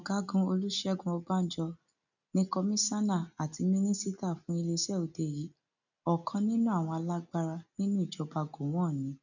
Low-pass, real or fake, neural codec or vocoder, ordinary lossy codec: 7.2 kHz; real; none; none